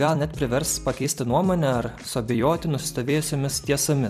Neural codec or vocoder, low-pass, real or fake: vocoder, 44.1 kHz, 128 mel bands every 256 samples, BigVGAN v2; 14.4 kHz; fake